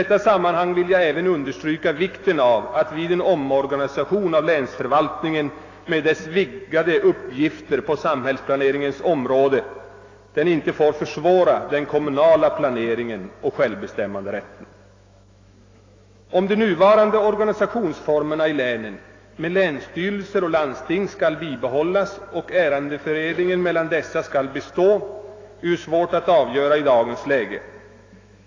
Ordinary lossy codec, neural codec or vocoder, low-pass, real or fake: AAC, 32 kbps; autoencoder, 48 kHz, 128 numbers a frame, DAC-VAE, trained on Japanese speech; 7.2 kHz; fake